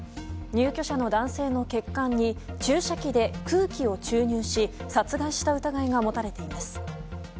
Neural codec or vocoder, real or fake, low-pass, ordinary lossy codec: none; real; none; none